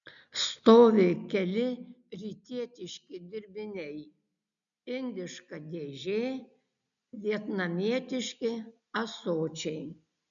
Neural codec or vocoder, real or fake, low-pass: none; real; 7.2 kHz